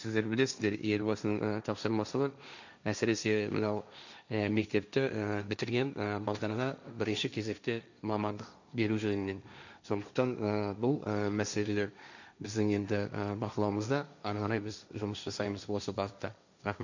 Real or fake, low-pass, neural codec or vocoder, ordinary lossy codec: fake; 7.2 kHz; codec, 16 kHz, 1.1 kbps, Voila-Tokenizer; none